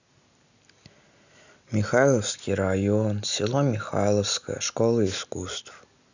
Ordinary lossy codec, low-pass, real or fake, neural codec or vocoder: none; 7.2 kHz; real; none